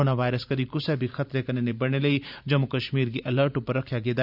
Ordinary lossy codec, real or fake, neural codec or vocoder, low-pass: none; fake; vocoder, 44.1 kHz, 128 mel bands every 512 samples, BigVGAN v2; 5.4 kHz